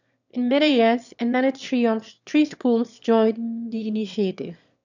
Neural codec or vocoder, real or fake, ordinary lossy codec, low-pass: autoencoder, 22.05 kHz, a latent of 192 numbers a frame, VITS, trained on one speaker; fake; none; 7.2 kHz